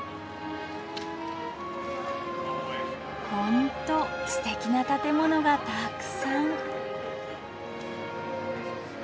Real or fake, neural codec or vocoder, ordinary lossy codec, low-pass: real; none; none; none